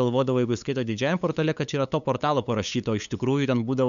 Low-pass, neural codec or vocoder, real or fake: 7.2 kHz; codec, 16 kHz, 8 kbps, FunCodec, trained on LibriTTS, 25 frames a second; fake